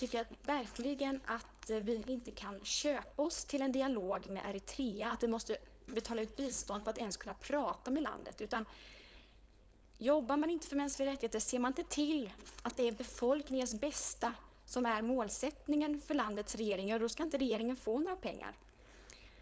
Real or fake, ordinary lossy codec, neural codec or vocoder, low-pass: fake; none; codec, 16 kHz, 4.8 kbps, FACodec; none